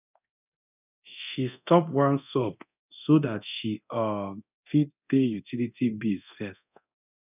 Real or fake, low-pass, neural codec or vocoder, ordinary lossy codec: fake; 3.6 kHz; codec, 24 kHz, 0.9 kbps, DualCodec; none